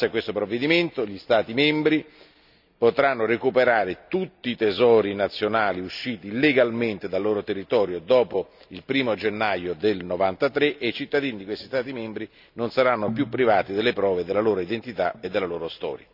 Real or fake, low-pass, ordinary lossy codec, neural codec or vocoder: real; 5.4 kHz; none; none